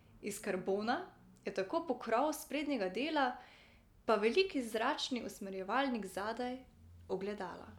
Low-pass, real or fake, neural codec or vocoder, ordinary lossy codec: 19.8 kHz; real; none; none